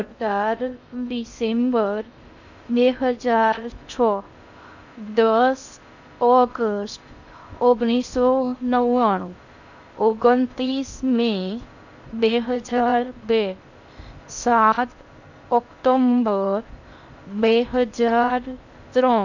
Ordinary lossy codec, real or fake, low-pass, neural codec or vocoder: none; fake; 7.2 kHz; codec, 16 kHz in and 24 kHz out, 0.6 kbps, FocalCodec, streaming, 2048 codes